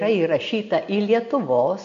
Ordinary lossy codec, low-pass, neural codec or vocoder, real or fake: MP3, 48 kbps; 7.2 kHz; none; real